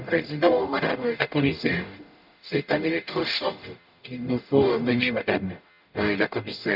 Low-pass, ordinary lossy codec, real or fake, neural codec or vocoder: 5.4 kHz; none; fake; codec, 44.1 kHz, 0.9 kbps, DAC